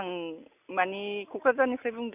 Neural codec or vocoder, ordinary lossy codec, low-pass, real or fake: none; none; 3.6 kHz; real